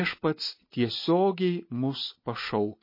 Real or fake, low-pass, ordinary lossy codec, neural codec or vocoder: real; 5.4 kHz; MP3, 24 kbps; none